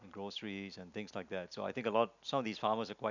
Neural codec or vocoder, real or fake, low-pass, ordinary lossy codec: none; real; 7.2 kHz; none